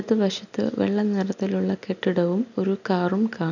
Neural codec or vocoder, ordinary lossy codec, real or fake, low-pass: none; none; real; 7.2 kHz